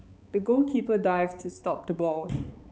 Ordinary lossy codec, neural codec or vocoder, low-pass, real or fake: none; codec, 16 kHz, 4 kbps, X-Codec, HuBERT features, trained on balanced general audio; none; fake